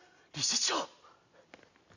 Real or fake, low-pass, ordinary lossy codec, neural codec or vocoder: real; 7.2 kHz; none; none